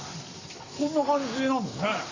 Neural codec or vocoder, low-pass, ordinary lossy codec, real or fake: codec, 44.1 kHz, 7.8 kbps, Pupu-Codec; 7.2 kHz; Opus, 64 kbps; fake